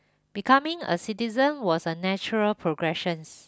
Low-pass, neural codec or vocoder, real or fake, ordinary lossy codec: none; none; real; none